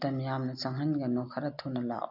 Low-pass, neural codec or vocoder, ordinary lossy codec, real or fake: 5.4 kHz; none; none; real